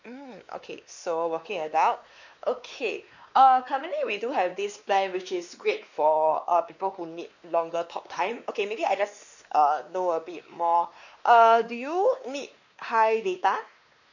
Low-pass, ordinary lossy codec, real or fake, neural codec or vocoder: 7.2 kHz; none; fake; codec, 16 kHz, 2 kbps, X-Codec, WavLM features, trained on Multilingual LibriSpeech